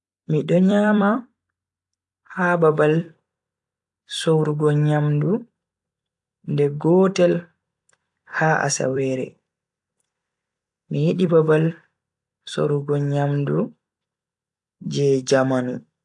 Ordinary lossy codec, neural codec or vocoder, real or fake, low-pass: AAC, 64 kbps; vocoder, 44.1 kHz, 128 mel bands every 256 samples, BigVGAN v2; fake; 10.8 kHz